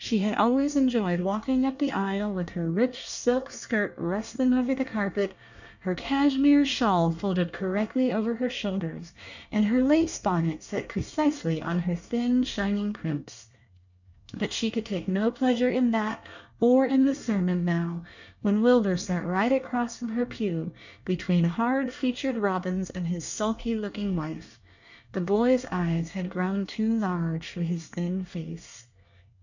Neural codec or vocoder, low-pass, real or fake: codec, 24 kHz, 1 kbps, SNAC; 7.2 kHz; fake